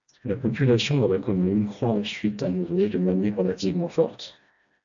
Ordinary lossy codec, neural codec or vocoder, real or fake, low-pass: AAC, 64 kbps; codec, 16 kHz, 1 kbps, FreqCodec, smaller model; fake; 7.2 kHz